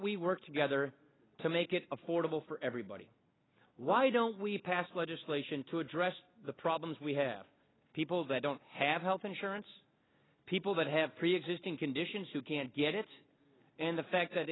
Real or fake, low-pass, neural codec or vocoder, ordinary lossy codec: real; 7.2 kHz; none; AAC, 16 kbps